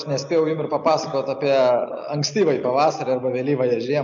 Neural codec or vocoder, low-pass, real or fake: none; 7.2 kHz; real